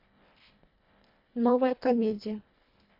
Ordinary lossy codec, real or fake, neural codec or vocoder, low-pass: MP3, 48 kbps; fake; codec, 24 kHz, 1.5 kbps, HILCodec; 5.4 kHz